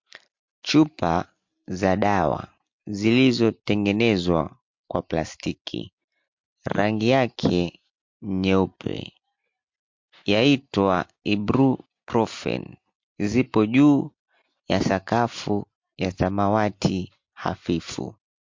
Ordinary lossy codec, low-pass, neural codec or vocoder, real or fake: MP3, 48 kbps; 7.2 kHz; none; real